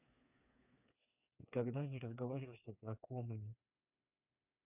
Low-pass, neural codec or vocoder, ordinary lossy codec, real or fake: 3.6 kHz; codec, 16 kHz, 4 kbps, FreqCodec, smaller model; none; fake